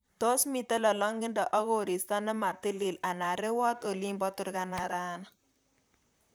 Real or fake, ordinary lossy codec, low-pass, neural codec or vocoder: fake; none; none; vocoder, 44.1 kHz, 128 mel bands, Pupu-Vocoder